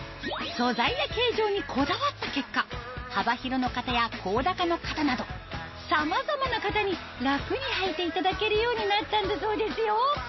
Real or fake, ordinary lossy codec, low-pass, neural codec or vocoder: real; MP3, 24 kbps; 7.2 kHz; none